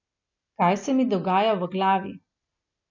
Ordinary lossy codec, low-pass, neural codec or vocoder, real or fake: none; 7.2 kHz; none; real